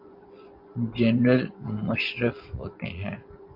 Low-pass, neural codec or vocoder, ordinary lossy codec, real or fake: 5.4 kHz; vocoder, 24 kHz, 100 mel bands, Vocos; MP3, 48 kbps; fake